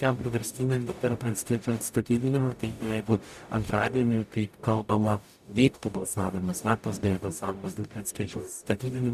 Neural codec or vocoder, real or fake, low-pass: codec, 44.1 kHz, 0.9 kbps, DAC; fake; 14.4 kHz